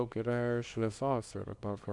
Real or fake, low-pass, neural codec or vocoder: fake; 10.8 kHz; codec, 24 kHz, 0.9 kbps, WavTokenizer, small release